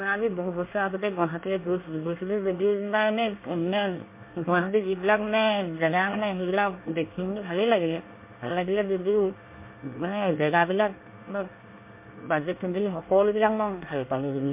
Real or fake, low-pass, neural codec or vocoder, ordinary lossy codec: fake; 3.6 kHz; codec, 24 kHz, 1 kbps, SNAC; MP3, 24 kbps